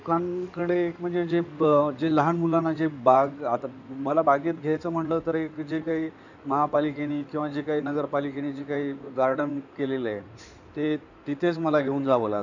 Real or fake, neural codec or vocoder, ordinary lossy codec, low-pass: fake; codec, 16 kHz in and 24 kHz out, 2.2 kbps, FireRedTTS-2 codec; none; 7.2 kHz